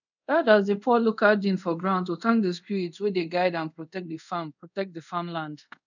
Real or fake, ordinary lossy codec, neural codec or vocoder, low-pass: fake; none; codec, 24 kHz, 0.5 kbps, DualCodec; 7.2 kHz